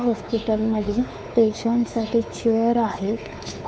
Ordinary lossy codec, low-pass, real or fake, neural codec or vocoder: none; none; fake; codec, 16 kHz, 4 kbps, X-Codec, WavLM features, trained on Multilingual LibriSpeech